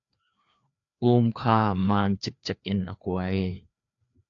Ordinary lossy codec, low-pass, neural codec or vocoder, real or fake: AAC, 64 kbps; 7.2 kHz; codec, 16 kHz, 2 kbps, FreqCodec, larger model; fake